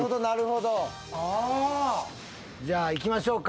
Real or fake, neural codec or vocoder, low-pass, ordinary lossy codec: real; none; none; none